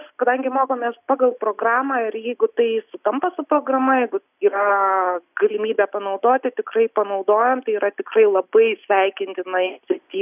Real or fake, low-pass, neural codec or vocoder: real; 3.6 kHz; none